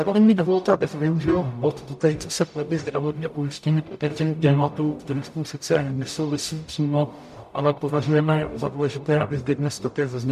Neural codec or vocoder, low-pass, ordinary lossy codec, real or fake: codec, 44.1 kHz, 0.9 kbps, DAC; 14.4 kHz; MP3, 96 kbps; fake